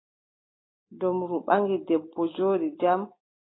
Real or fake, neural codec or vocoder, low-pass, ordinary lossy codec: real; none; 7.2 kHz; AAC, 16 kbps